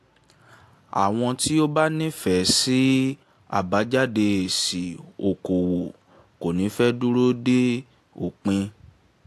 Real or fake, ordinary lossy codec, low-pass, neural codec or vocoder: real; AAC, 64 kbps; 14.4 kHz; none